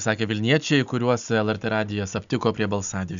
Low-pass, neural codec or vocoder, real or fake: 7.2 kHz; none; real